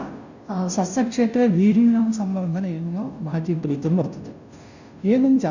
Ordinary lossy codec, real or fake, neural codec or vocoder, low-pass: none; fake; codec, 16 kHz, 0.5 kbps, FunCodec, trained on Chinese and English, 25 frames a second; 7.2 kHz